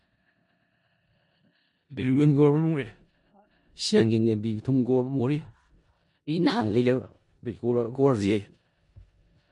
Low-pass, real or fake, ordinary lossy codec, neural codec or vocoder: 10.8 kHz; fake; MP3, 48 kbps; codec, 16 kHz in and 24 kHz out, 0.4 kbps, LongCat-Audio-Codec, four codebook decoder